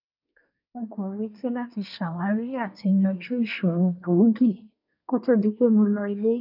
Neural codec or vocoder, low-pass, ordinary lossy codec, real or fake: codec, 24 kHz, 1 kbps, SNAC; 5.4 kHz; none; fake